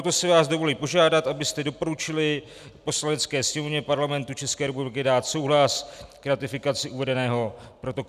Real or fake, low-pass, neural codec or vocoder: real; 14.4 kHz; none